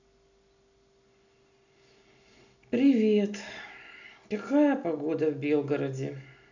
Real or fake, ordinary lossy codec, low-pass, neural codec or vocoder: real; none; 7.2 kHz; none